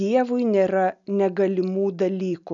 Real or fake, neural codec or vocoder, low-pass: real; none; 7.2 kHz